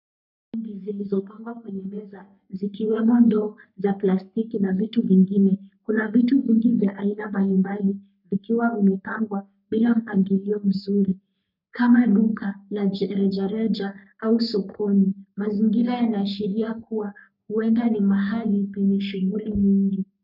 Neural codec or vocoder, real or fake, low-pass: codec, 44.1 kHz, 3.4 kbps, Pupu-Codec; fake; 5.4 kHz